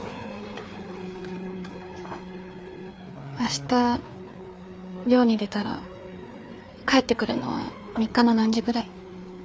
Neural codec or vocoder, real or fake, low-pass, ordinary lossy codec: codec, 16 kHz, 4 kbps, FreqCodec, larger model; fake; none; none